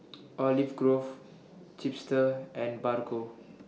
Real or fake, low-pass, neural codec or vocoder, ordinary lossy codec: real; none; none; none